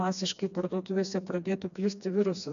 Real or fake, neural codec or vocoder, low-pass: fake; codec, 16 kHz, 2 kbps, FreqCodec, smaller model; 7.2 kHz